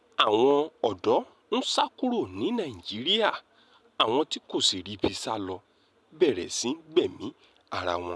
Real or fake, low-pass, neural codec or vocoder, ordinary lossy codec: real; none; none; none